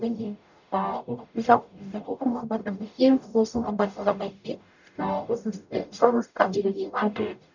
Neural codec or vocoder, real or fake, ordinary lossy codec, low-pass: codec, 44.1 kHz, 0.9 kbps, DAC; fake; none; 7.2 kHz